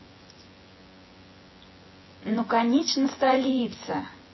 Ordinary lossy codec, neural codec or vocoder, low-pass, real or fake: MP3, 24 kbps; vocoder, 24 kHz, 100 mel bands, Vocos; 7.2 kHz; fake